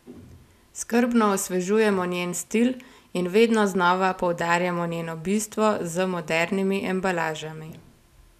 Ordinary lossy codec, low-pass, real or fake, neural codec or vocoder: none; 14.4 kHz; real; none